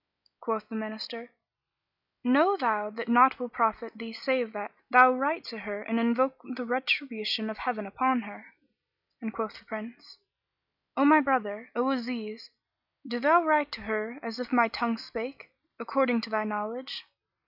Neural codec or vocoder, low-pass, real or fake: none; 5.4 kHz; real